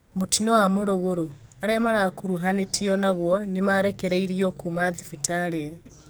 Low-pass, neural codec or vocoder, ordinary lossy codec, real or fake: none; codec, 44.1 kHz, 2.6 kbps, SNAC; none; fake